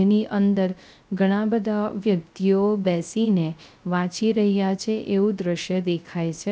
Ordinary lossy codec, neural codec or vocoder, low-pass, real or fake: none; codec, 16 kHz, 0.3 kbps, FocalCodec; none; fake